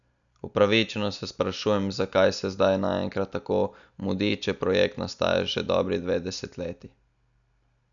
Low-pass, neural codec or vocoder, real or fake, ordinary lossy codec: 7.2 kHz; none; real; none